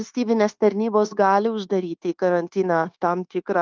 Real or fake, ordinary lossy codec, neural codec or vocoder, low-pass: fake; Opus, 32 kbps; codec, 16 kHz, 0.9 kbps, LongCat-Audio-Codec; 7.2 kHz